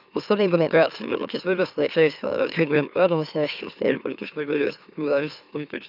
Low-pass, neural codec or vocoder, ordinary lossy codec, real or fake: 5.4 kHz; autoencoder, 44.1 kHz, a latent of 192 numbers a frame, MeloTTS; none; fake